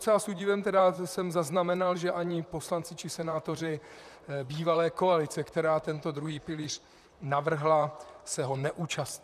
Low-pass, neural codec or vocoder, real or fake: 14.4 kHz; vocoder, 44.1 kHz, 128 mel bands, Pupu-Vocoder; fake